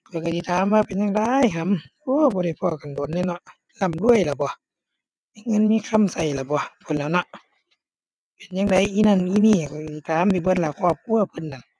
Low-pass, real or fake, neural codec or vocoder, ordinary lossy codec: none; fake; vocoder, 22.05 kHz, 80 mel bands, WaveNeXt; none